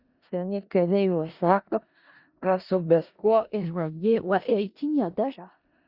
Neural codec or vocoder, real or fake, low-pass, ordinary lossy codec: codec, 16 kHz in and 24 kHz out, 0.4 kbps, LongCat-Audio-Codec, four codebook decoder; fake; 5.4 kHz; Opus, 64 kbps